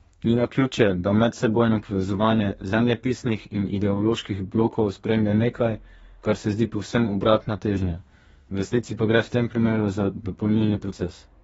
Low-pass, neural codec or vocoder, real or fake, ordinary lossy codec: 14.4 kHz; codec, 32 kHz, 1.9 kbps, SNAC; fake; AAC, 24 kbps